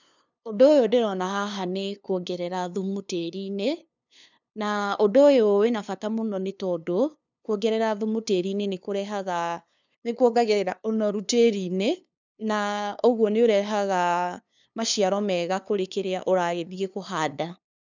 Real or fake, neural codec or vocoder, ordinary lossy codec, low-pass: fake; codec, 16 kHz, 2 kbps, FunCodec, trained on LibriTTS, 25 frames a second; none; 7.2 kHz